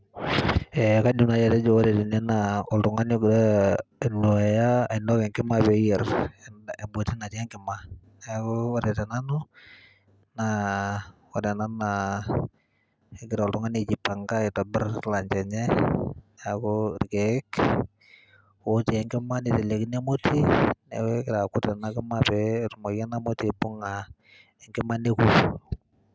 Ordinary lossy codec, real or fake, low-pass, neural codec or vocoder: none; real; none; none